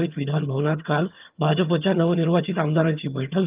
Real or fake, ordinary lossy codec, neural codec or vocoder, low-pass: fake; Opus, 24 kbps; vocoder, 22.05 kHz, 80 mel bands, HiFi-GAN; 3.6 kHz